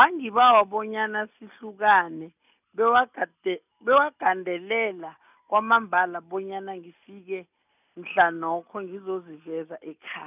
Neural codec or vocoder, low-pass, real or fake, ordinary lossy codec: none; 3.6 kHz; real; none